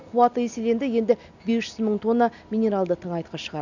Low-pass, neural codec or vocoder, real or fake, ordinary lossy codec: 7.2 kHz; none; real; none